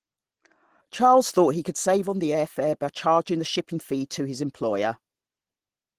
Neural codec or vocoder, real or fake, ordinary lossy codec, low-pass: none; real; Opus, 16 kbps; 14.4 kHz